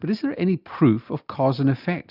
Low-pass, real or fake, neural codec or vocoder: 5.4 kHz; real; none